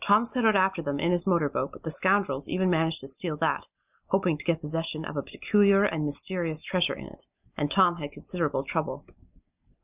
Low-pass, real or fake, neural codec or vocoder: 3.6 kHz; real; none